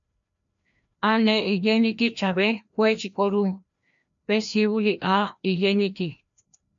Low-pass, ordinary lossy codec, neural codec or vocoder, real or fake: 7.2 kHz; MP3, 64 kbps; codec, 16 kHz, 1 kbps, FreqCodec, larger model; fake